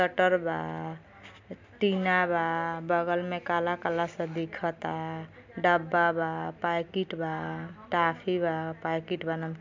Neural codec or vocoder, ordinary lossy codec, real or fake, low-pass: none; MP3, 64 kbps; real; 7.2 kHz